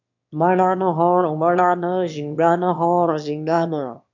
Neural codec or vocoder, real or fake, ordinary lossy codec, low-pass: autoencoder, 22.05 kHz, a latent of 192 numbers a frame, VITS, trained on one speaker; fake; MP3, 64 kbps; 7.2 kHz